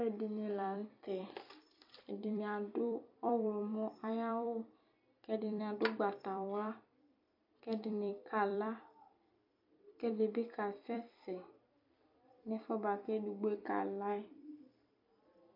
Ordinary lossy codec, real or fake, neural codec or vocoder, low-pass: MP3, 48 kbps; fake; vocoder, 44.1 kHz, 128 mel bands every 256 samples, BigVGAN v2; 5.4 kHz